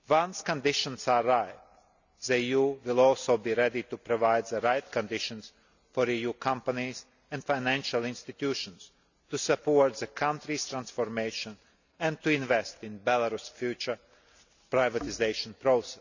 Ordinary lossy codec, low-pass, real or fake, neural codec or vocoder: none; 7.2 kHz; real; none